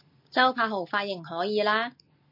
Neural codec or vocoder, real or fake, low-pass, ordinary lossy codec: none; real; 5.4 kHz; MP3, 32 kbps